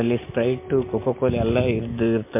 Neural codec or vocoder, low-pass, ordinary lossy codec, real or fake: none; 3.6 kHz; MP3, 24 kbps; real